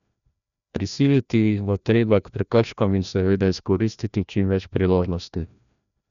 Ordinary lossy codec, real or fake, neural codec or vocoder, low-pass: none; fake; codec, 16 kHz, 1 kbps, FreqCodec, larger model; 7.2 kHz